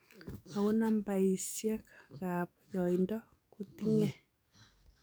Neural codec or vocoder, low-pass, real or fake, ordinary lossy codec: codec, 44.1 kHz, 7.8 kbps, DAC; none; fake; none